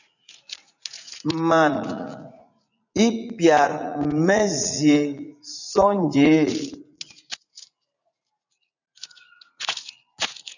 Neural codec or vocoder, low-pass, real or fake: vocoder, 44.1 kHz, 80 mel bands, Vocos; 7.2 kHz; fake